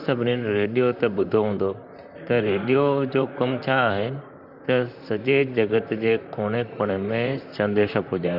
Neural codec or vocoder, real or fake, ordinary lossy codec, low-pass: vocoder, 44.1 kHz, 128 mel bands, Pupu-Vocoder; fake; none; 5.4 kHz